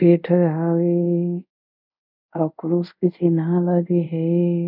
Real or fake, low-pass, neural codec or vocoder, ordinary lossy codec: fake; 5.4 kHz; codec, 24 kHz, 0.5 kbps, DualCodec; none